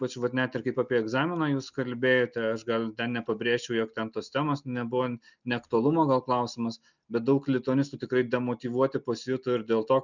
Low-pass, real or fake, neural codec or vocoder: 7.2 kHz; real; none